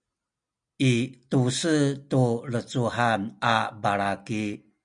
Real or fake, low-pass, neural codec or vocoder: real; 9.9 kHz; none